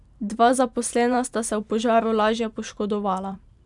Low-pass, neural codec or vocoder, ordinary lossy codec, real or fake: 10.8 kHz; vocoder, 44.1 kHz, 128 mel bands every 512 samples, BigVGAN v2; none; fake